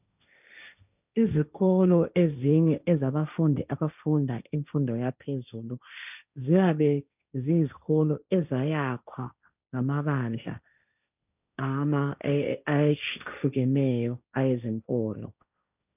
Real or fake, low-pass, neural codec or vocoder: fake; 3.6 kHz; codec, 16 kHz, 1.1 kbps, Voila-Tokenizer